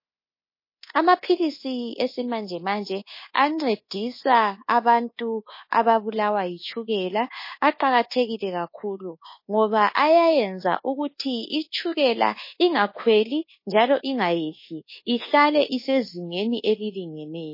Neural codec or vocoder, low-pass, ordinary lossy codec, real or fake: codec, 24 kHz, 1.2 kbps, DualCodec; 5.4 kHz; MP3, 24 kbps; fake